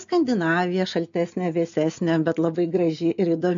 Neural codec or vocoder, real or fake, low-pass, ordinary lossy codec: none; real; 7.2 kHz; MP3, 64 kbps